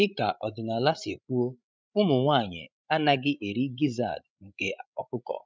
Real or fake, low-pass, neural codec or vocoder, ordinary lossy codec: fake; none; codec, 16 kHz, 16 kbps, FreqCodec, larger model; none